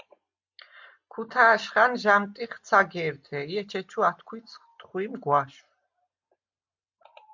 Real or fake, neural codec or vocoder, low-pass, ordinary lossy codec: real; none; 7.2 kHz; MP3, 64 kbps